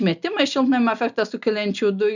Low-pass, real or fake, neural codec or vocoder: 7.2 kHz; real; none